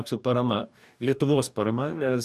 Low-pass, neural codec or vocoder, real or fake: 14.4 kHz; codec, 44.1 kHz, 2.6 kbps, DAC; fake